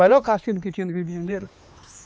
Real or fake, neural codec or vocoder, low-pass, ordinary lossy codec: fake; codec, 16 kHz, 2 kbps, X-Codec, HuBERT features, trained on LibriSpeech; none; none